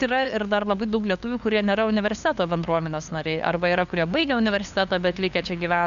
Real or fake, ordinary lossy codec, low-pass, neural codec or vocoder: fake; MP3, 96 kbps; 7.2 kHz; codec, 16 kHz, 2 kbps, FunCodec, trained on LibriTTS, 25 frames a second